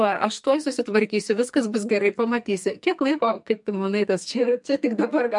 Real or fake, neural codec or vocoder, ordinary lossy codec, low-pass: fake; codec, 44.1 kHz, 2.6 kbps, SNAC; MP3, 64 kbps; 10.8 kHz